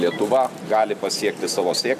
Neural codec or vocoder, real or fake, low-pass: none; real; 14.4 kHz